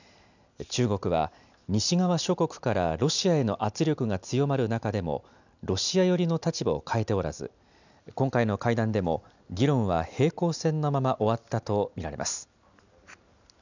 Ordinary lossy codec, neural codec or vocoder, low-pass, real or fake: none; none; 7.2 kHz; real